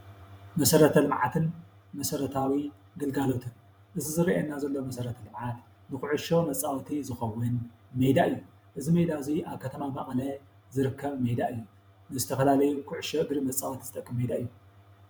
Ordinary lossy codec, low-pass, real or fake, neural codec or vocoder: MP3, 96 kbps; 19.8 kHz; fake; vocoder, 44.1 kHz, 128 mel bands every 512 samples, BigVGAN v2